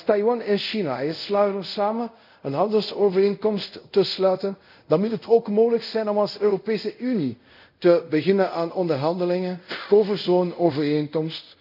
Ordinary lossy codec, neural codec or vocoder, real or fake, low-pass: none; codec, 24 kHz, 0.5 kbps, DualCodec; fake; 5.4 kHz